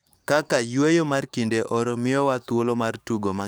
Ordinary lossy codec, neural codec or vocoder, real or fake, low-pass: none; codec, 44.1 kHz, 7.8 kbps, DAC; fake; none